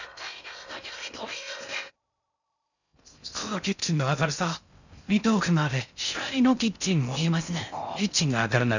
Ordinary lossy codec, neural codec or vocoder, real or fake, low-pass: none; codec, 16 kHz in and 24 kHz out, 0.6 kbps, FocalCodec, streaming, 2048 codes; fake; 7.2 kHz